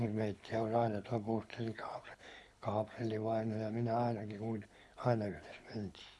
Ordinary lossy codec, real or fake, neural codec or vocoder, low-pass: none; fake; codec, 24 kHz, 6 kbps, HILCodec; none